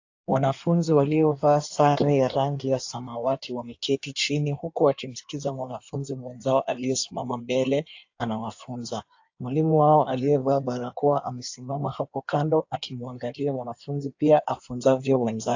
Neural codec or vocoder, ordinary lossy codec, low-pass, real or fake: codec, 16 kHz in and 24 kHz out, 1.1 kbps, FireRedTTS-2 codec; AAC, 48 kbps; 7.2 kHz; fake